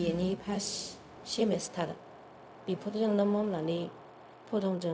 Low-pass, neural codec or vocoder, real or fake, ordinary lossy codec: none; codec, 16 kHz, 0.4 kbps, LongCat-Audio-Codec; fake; none